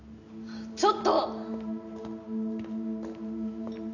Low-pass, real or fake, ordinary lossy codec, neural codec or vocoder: 7.2 kHz; real; none; none